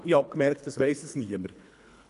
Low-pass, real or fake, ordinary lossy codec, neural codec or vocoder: 10.8 kHz; fake; none; codec, 24 kHz, 3 kbps, HILCodec